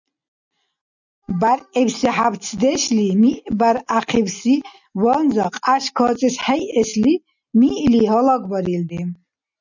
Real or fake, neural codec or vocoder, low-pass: real; none; 7.2 kHz